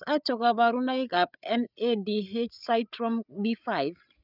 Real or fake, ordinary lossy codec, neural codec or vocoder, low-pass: fake; none; codec, 16 kHz, 8 kbps, FreqCodec, larger model; 5.4 kHz